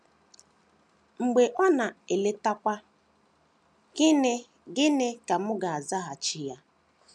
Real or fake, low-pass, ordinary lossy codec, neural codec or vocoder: real; none; none; none